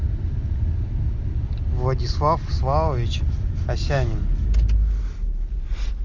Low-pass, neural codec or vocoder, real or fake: 7.2 kHz; none; real